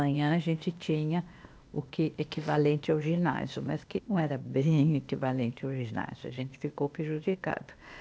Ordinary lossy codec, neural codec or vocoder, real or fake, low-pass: none; codec, 16 kHz, 0.8 kbps, ZipCodec; fake; none